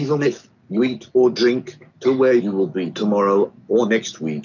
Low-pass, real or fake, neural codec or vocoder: 7.2 kHz; real; none